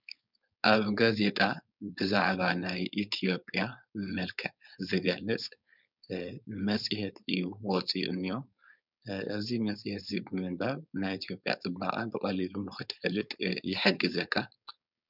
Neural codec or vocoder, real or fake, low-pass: codec, 16 kHz, 4.8 kbps, FACodec; fake; 5.4 kHz